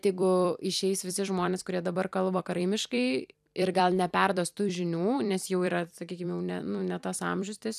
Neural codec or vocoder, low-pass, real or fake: vocoder, 44.1 kHz, 128 mel bands every 256 samples, BigVGAN v2; 14.4 kHz; fake